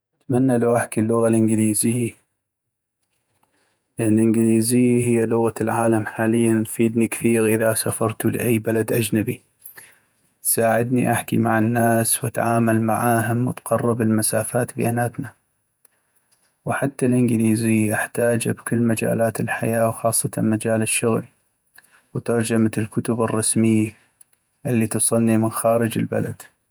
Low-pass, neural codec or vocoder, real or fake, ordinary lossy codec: none; vocoder, 48 kHz, 128 mel bands, Vocos; fake; none